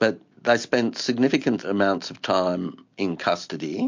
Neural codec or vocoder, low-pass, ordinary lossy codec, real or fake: none; 7.2 kHz; MP3, 48 kbps; real